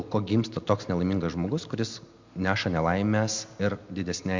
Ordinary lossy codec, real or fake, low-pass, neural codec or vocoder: MP3, 64 kbps; real; 7.2 kHz; none